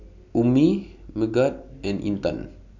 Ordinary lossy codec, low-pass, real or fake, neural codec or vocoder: none; 7.2 kHz; real; none